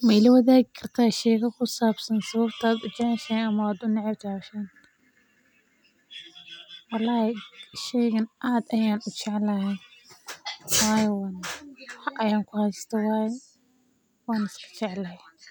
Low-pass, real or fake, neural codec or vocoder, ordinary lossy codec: none; real; none; none